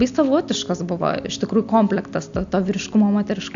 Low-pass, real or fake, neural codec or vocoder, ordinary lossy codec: 7.2 kHz; real; none; AAC, 64 kbps